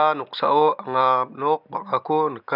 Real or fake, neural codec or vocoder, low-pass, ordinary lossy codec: real; none; 5.4 kHz; none